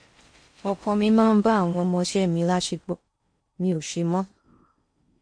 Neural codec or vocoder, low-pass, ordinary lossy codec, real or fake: codec, 16 kHz in and 24 kHz out, 0.6 kbps, FocalCodec, streaming, 4096 codes; 9.9 kHz; MP3, 48 kbps; fake